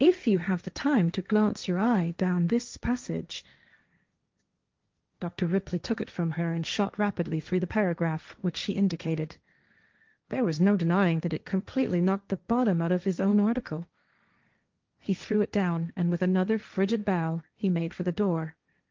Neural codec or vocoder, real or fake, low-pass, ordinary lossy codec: codec, 16 kHz, 1.1 kbps, Voila-Tokenizer; fake; 7.2 kHz; Opus, 32 kbps